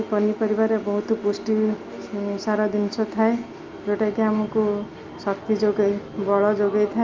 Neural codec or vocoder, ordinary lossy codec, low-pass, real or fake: none; Opus, 32 kbps; 7.2 kHz; real